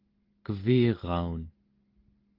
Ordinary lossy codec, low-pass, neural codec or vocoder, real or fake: Opus, 16 kbps; 5.4 kHz; none; real